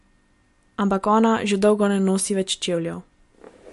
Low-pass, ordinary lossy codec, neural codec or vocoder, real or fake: 14.4 kHz; MP3, 48 kbps; none; real